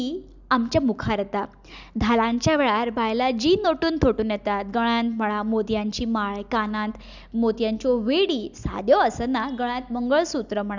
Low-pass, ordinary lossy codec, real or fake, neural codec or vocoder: 7.2 kHz; none; real; none